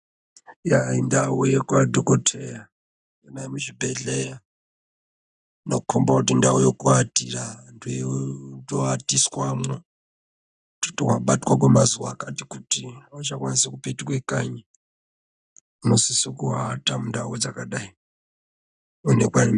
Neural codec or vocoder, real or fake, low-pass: none; real; 9.9 kHz